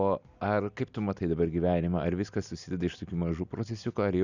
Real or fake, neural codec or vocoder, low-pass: real; none; 7.2 kHz